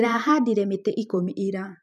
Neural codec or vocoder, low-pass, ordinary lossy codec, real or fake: vocoder, 44.1 kHz, 128 mel bands every 512 samples, BigVGAN v2; 14.4 kHz; none; fake